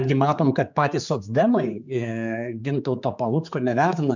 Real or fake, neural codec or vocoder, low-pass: fake; codec, 16 kHz, 4 kbps, X-Codec, HuBERT features, trained on general audio; 7.2 kHz